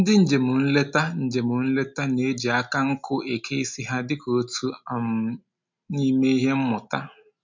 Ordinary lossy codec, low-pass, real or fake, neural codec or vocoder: MP3, 64 kbps; 7.2 kHz; real; none